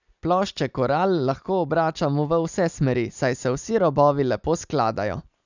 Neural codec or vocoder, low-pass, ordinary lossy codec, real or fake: none; 7.2 kHz; none; real